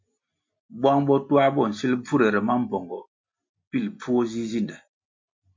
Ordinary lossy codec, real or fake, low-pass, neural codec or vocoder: MP3, 48 kbps; real; 7.2 kHz; none